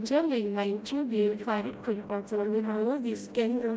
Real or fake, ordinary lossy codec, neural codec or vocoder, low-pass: fake; none; codec, 16 kHz, 0.5 kbps, FreqCodec, smaller model; none